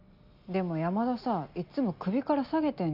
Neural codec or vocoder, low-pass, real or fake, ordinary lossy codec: none; 5.4 kHz; real; none